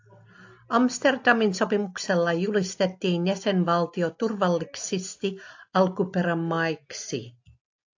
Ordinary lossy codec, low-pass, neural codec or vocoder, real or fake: AAC, 48 kbps; 7.2 kHz; none; real